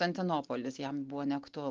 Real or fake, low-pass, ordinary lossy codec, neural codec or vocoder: real; 7.2 kHz; Opus, 24 kbps; none